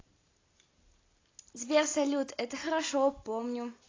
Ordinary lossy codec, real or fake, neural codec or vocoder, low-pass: AAC, 32 kbps; real; none; 7.2 kHz